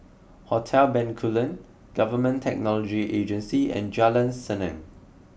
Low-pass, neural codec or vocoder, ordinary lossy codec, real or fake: none; none; none; real